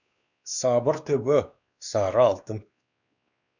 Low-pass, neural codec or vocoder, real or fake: 7.2 kHz; codec, 16 kHz, 2 kbps, X-Codec, WavLM features, trained on Multilingual LibriSpeech; fake